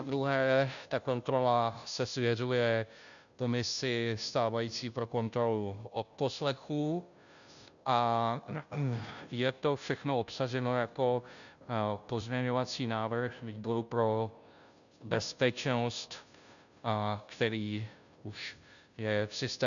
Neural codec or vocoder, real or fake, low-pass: codec, 16 kHz, 0.5 kbps, FunCodec, trained on Chinese and English, 25 frames a second; fake; 7.2 kHz